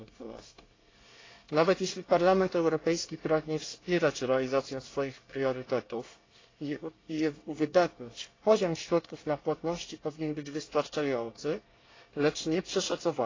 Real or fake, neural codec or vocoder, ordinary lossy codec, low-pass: fake; codec, 24 kHz, 1 kbps, SNAC; AAC, 32 kbps; 7.2 kHz